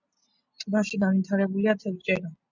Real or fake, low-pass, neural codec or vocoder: real; 7.2 kHz; none